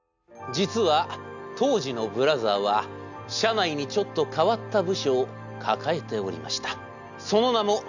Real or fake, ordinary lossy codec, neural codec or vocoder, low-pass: real; none; none; 7.2 kHz